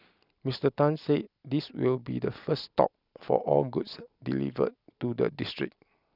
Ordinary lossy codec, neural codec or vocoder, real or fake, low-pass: none; none; real; 5.4 kHz